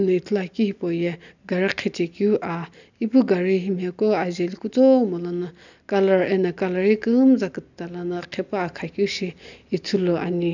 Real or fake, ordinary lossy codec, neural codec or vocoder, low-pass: real; none; none; 7.2 kHz